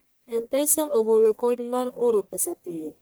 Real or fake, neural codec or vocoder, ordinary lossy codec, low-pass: fake; codec, 44.1 kHz, 1.7 kbps, Pupu-Codec; none; none